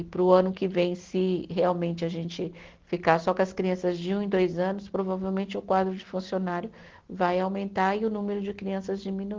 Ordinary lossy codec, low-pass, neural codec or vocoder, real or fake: Opus, 16 kbps; 7.2 kHz; none; real